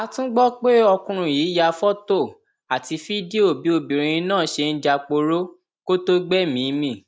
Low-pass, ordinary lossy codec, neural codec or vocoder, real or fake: none; none; none; real